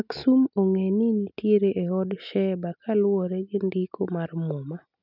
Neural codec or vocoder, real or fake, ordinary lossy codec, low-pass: none; real; none; 5.4 kHz